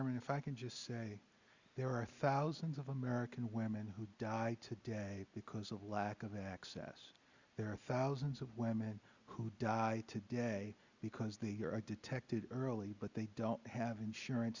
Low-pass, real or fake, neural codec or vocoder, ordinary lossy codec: 7.2 kHz; real; none; Opus, 64 kbps